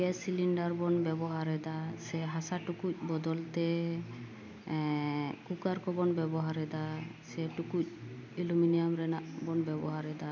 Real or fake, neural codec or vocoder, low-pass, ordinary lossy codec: real; none; none; none